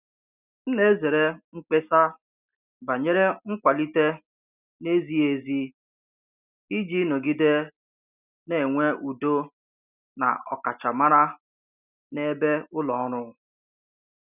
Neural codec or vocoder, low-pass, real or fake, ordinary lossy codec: none; 3.6 kHz; real; none